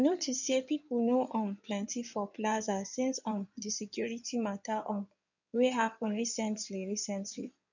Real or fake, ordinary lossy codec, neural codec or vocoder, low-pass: fake; none; codec, 16 kHz in and 24 kHz out, 2.2 kbps, FireRedTTS-2 codec; 7.2 kHz